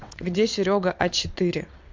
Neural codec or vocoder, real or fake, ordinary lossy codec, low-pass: vocoder, 22.05 kHz, 80 mel bands, WaveNeXt; fake; MP3, 48 kbps; 7.2 kHz